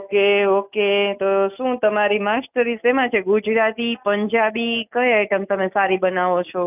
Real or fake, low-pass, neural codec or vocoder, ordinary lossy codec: real; 3.6 kHz; none; none